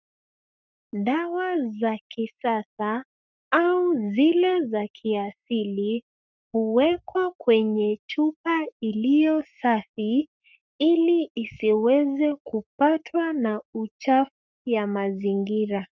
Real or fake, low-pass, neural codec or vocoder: fake; 7.2 kHz; codec, 44.1 kHz, 7.8 kbps, Pupu-Codec